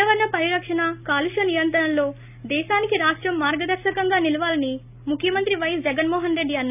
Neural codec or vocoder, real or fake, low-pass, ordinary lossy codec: none; real; 3.6 kHz; none